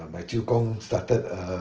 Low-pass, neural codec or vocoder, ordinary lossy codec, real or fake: 7.2 kHz; none; Opus, 16 kbps; real